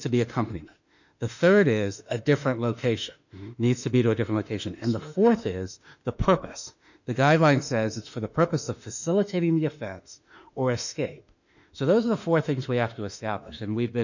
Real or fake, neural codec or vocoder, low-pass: fake; autoencoder, 48 kHz, 32 numbers a frame, DAC-VAE, trained on Japanese speech; 7.2 kHz